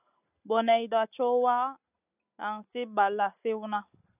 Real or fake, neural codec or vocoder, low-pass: real; none; 3.6 kHz